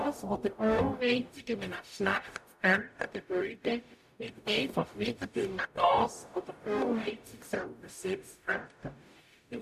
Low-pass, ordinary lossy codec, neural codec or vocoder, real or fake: 14.4 kHz; AAC, 96 kbps; codec, 44.1 kHz, 0.9 kbps, DAC; fake